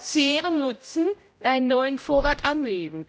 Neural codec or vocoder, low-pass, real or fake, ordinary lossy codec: codec, 16 kHz, 0.5 kbps, X-Codec, HuBERT features, trained on general audio; none; fake; none